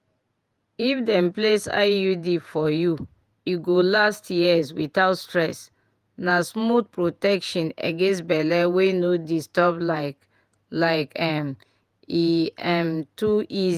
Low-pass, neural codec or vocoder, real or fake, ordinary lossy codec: 14.4 kHz; vocoder, 48 kHz, 128 mel bands, Vocos; fake; Opus, 32 kbps